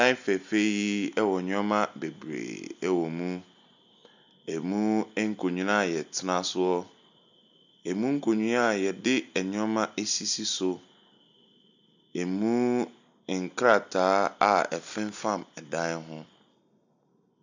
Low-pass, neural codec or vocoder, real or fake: 7.2 kHz; none; real